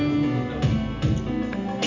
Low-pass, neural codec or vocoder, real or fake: 7.2 kHz; none; real